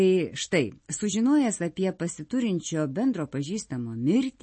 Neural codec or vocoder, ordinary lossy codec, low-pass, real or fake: none; MP3, 32 kbps; 9.9 kHz; real